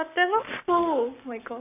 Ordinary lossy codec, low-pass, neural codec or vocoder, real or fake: none; 3.6 kHz; codec, 44.1 kHz, 7.8 kbps, Pupu-Codec; fake